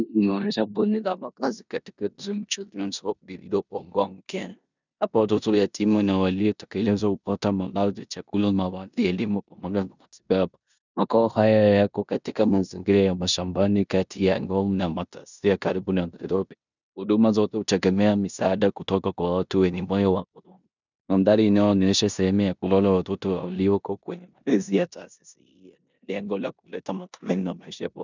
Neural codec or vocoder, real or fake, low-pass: codec, 16 kHz in and 24 kHz out, 0.9 kbps, LongCat-Audio-Codec, four codebook decoder; fake; 7.2 kHz